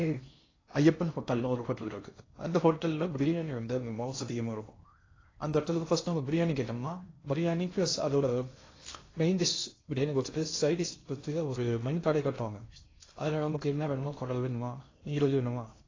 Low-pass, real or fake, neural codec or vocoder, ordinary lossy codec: 7.2 kHz; fake; codec, 16 kHz in and 24 kHz out, 0.6 kbps, FocalCodec, streaming, 4096 codes; AAC, 32 kbps